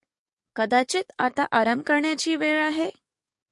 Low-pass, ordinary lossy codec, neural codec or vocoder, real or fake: 10.8 kHz; MP3, 48 kbps; codec, 44.1 kHz, 7.8 kbps, DAC; fake